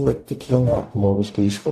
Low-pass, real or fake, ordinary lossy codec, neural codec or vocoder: 14.4 kHz; fake; AAC, 64 kbps; codec, 44.1 kHz, 0.9 kbps, DAC